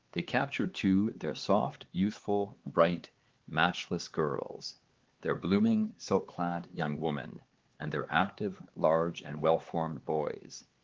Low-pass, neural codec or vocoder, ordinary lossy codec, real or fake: 7.2 kHz; codec, 16 kHz, 4 kbps, X-Codec, HuBERT features, trained on LibriSpeech; Opus, 16 kbps; fake